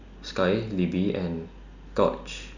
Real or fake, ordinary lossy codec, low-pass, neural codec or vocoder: real; none; 7.2 kHz; none